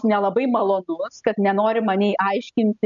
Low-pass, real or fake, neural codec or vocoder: 7.2 kHz; real; none